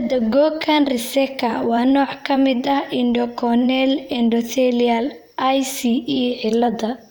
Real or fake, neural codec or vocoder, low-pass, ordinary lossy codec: fake; vocoder, 44.1 kHz, 128 mel bands, Pupu-Vocoder; none; none